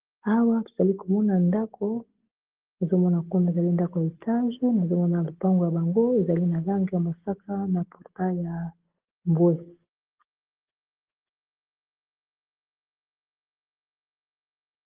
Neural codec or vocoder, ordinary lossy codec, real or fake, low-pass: none; Opus, 16 kbps; real; 3.6 kHz